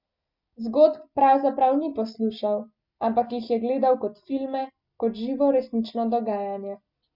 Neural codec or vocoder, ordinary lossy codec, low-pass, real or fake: none; MP3, 48 kbps; 5.4 kHz; real